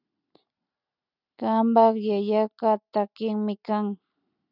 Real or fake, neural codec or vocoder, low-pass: real; none; 5.4 kHz